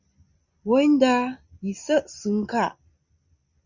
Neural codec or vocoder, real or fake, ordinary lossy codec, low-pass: none; real; Opus, 64 kbps; 7.2 kHz